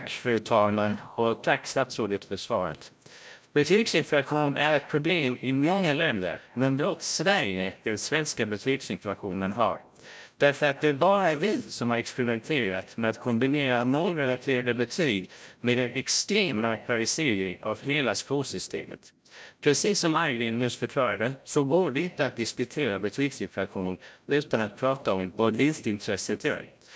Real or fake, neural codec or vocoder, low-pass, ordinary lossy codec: fake; codec, 16 kHz, 0.5 kbps, FreqCodec, larger model; none; none